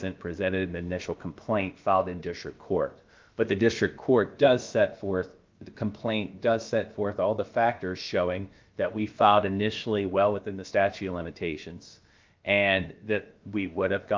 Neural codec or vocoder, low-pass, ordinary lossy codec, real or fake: codec, 16 kHz, about 1 kbps, DyCAST, with the encoder's durations; 7.2 kHz; Opus, 24 kbps; fake